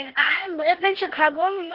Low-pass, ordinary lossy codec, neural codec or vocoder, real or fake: 5.4 kHz; Opus, 16 kbps; codec, 16 kHz, 0.8 kbps, ZipCodec; fake